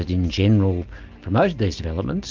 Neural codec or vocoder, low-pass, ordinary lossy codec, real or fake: none; 7.2 kHz; Opus, 32 kbps; real